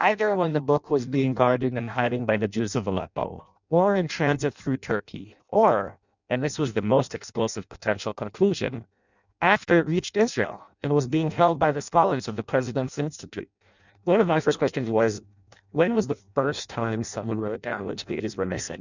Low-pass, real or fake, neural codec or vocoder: 7.2 kHz; fake; codec, 16 kHz in and 24 kHz out, 0.6 kbps, FireRedTTS-2 codec